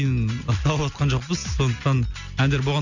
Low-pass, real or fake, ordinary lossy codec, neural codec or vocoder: 7.2 kHz; real; none; none